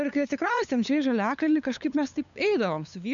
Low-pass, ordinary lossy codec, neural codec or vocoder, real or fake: 7.2 kHz; MP3, 96 kbps; codec, 16 kHz, 8 kbps, FunCodec, trained on LibriTTS, 25 frames a second; fake